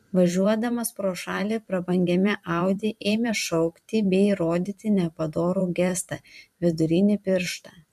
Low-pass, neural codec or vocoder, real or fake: 14.4 kHz; vocoder, 44.1 kHz, 128 mel bands every 512 samples, BigVGAN v2; fake